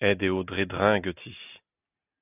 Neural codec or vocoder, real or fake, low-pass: none; real; 3.6 kHz